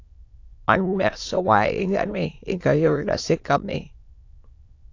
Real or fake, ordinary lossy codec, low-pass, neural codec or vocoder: fake; AAC, 48 kbps; 7.2 kHz; autoencoder, 22.05 kHz, a latent of 192 numbers a frame, VITS, trained on many speakers